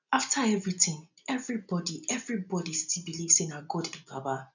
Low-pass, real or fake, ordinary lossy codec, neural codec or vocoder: 7.2 kHz; real; none; none